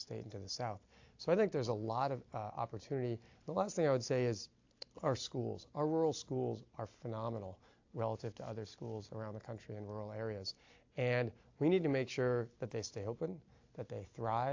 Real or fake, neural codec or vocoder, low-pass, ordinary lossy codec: real; none; 7.2 kHz; AAC, 48 kbps